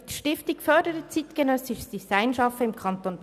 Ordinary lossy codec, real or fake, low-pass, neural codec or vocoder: none; real; 14.4 kHz; none